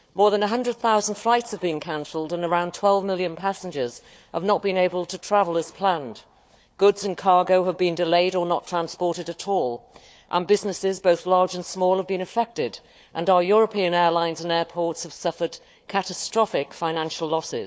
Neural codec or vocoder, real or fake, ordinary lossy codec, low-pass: codec, 16 kHz, 4 kbps, FunCodec, trained on Chinese and English, 50 frames a second; fake; none; none